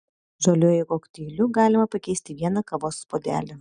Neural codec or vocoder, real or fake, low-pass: none; real; 9.9 kHz